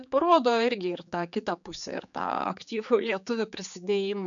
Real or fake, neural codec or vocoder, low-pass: fake; codec, 16 kHz, 4 kbps, X-Codec, HuBERT features, trained on general audio; 7.2 kHz